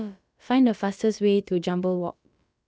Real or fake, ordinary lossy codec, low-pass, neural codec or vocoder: fake; none; none; codec, 16 kHz, about 1 kbps, DyCAST, with the encoder's durations